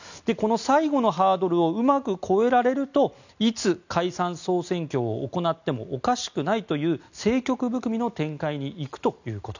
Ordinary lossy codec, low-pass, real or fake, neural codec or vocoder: none; 7.2 kHz; real; none